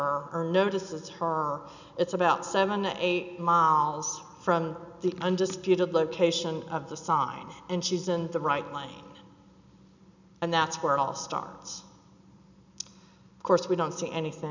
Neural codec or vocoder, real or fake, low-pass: none; real; 7.2 kHz